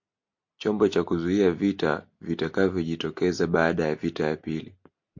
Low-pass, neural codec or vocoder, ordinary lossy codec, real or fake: 7.2 kHz; none; MP3, 48 kbps; real